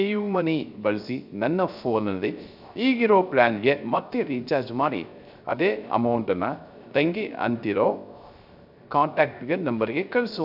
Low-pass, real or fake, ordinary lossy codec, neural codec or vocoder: 5.4 kHz; fake; none; codec, 16 kHz, 0.3 kbps, FocalCodec